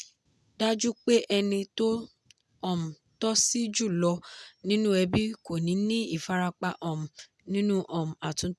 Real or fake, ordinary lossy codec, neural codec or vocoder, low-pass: real; none; none; none